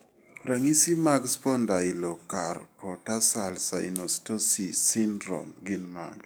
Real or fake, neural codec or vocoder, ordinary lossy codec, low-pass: fake; codec, 44.1 kHz, 7.8 kbps, DAC; none; none